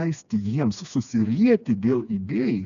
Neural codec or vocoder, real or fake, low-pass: codec, 16 kHz, 2 kbps, FreqCodec, smaller model; fake; 7.2 kHz